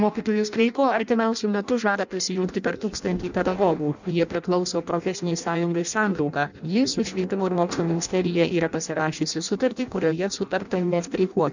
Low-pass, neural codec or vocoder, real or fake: 7.2 kHz; codec, 16 kHz in and 24 kHz out, 0.6 kbps, FireRedTTS-2 codec; fake